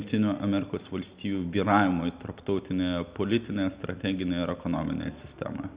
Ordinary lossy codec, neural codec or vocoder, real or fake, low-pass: Opus, 64 kbps; none; real; 3.6 kHz